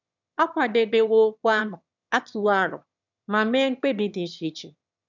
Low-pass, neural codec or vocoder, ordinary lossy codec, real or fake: 7.2 kHz; autoencoder, 22.05 kHz, a latent of 192 numbers a frame, VITS, trained on one speaker; none; fake